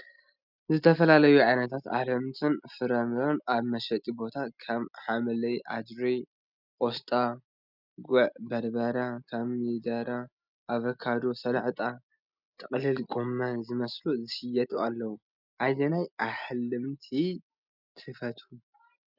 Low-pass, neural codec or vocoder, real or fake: 5.4 kHz; none; real